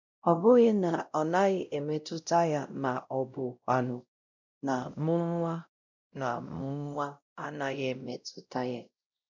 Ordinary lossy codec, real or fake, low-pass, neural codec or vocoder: none; fake; 7.2 kHz; codec, 16 kHz, 0.5 kbps, X-Codec, WavLM features, trained on Multilingual LibriSpeech